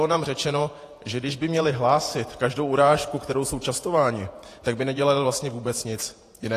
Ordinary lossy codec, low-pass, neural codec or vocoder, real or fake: AAC, 48 kbps; 14.4 kHz; vocoder, 48 kHz, 128 mel bands, Vocos; fake